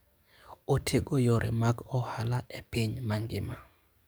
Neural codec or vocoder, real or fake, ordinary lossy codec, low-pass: codec, 44.1 kHz, 7.8 kbps, DAC; fake; none; none